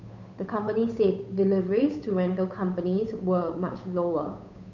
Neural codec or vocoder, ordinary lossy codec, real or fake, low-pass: codec, 16 kHz, 8 kbps, FunCodec, trained on Chinese and English, 25 frames a second; none; fake; 7.2 kHz